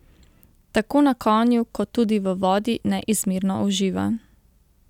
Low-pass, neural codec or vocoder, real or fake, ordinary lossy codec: 19.8 kHz; none; real; none